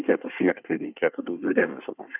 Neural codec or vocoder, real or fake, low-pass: codec, 24 kHz, 1 kbps, SNAC; fake; 3.6 kHz